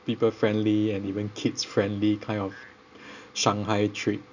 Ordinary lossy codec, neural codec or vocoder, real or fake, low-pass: none; none; real; 7.2 kHz